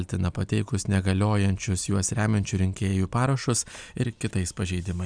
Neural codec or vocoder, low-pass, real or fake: none; 9.9 kHz; real